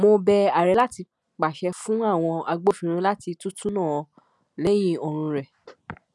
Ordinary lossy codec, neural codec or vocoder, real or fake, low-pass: none; none; real; none